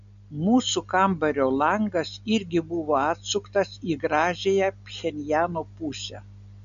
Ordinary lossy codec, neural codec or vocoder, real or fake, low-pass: MP3, 96 kbps; none; real; 7.2 kHz